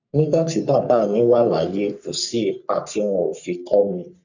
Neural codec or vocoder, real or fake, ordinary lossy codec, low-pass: codec, 44.1 kHz, 3.4 kbps, Pupu-Codec; fake; none; 7.2 kHz